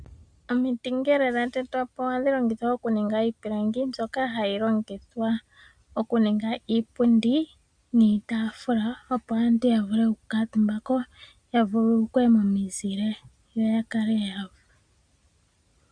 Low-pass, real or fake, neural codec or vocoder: 9.9 kHz; real; none